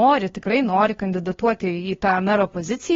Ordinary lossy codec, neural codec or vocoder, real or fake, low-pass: AAC, 24 kbps; codec, 16 kHz, 2 kbps, FunCodec, trained on LibriTTS, 25 frames a second; fake; 7.2 kHz